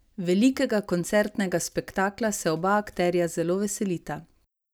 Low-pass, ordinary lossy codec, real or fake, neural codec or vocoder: none; none; real; none